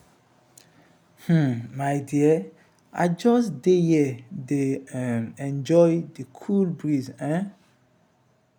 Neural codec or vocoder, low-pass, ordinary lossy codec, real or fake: none; none; none; real